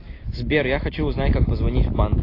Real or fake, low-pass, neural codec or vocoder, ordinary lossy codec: real; 5.4 kHz; none; AAC, 24 kbps